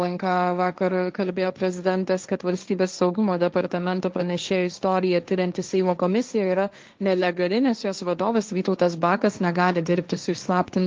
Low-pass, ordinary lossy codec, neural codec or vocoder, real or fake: 7.2 kHz; Opus, 32 kbps; codec, 16 kHz, 1.1 kbps, Voila-Tokenizer; fake